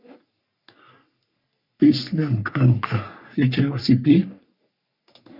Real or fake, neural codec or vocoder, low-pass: fake; codec, 44.1 kHz, 3.4 kbps, Pupu-Codec; 5.4 kHz